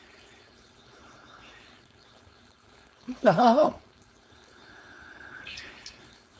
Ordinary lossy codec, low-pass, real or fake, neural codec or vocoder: none; none; fake; codec, 16 kHz, 4.8 kbps, FACodec